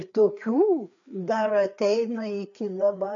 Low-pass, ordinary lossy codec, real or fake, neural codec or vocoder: 7.2 kHz; MP3, 64 kbps; fake; codec, 16 kHz, 4 kbps, FreqCodec, larger model